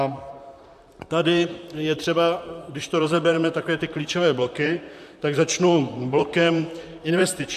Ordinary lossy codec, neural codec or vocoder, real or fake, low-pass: AAC, 96 kbps; vocoder, 44.1 kHz, 128 mel bands, Pupu-Vocoder; fake; 14.4 kHz